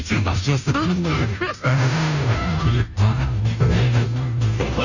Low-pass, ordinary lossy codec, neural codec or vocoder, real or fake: 7.2 kHz; none; codec, 16 kHz, 0.5 kbps, FunCodec, trained on Chinese and English, 25 frames a second; fake